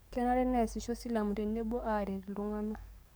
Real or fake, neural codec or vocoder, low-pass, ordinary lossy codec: fake; codec, 44.1 kHz, 7.8 kbps, DAC; none; none